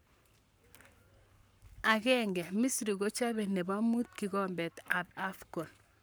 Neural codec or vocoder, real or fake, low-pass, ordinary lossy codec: codec, 44.1 kHz, 7.8 kbps, Pupu-Codec; fake; none; none